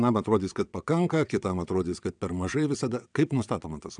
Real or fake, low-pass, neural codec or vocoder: fake; 9.9 kHz; vocoder, 22.05 kHz, 80 mel bands, WaveNeXt